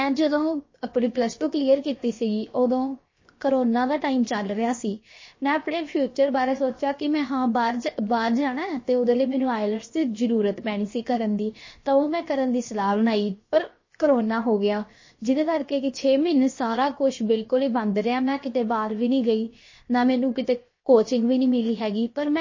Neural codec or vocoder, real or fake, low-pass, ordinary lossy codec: codec, 16 kHz, 0.7 kbps, FocalCodec; fake; 7.2 kHz; MP3, 32 kbps